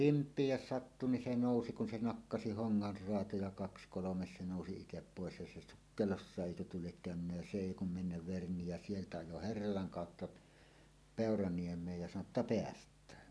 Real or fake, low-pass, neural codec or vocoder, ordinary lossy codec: real; none; none; none